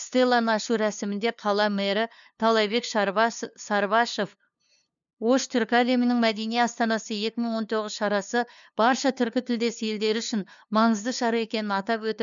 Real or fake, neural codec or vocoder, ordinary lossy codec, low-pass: fake; codec, 16 kHz, 2 kbps, FunCodec, trained on LibriTTS, 25 frames a second; none; 7.2 kHz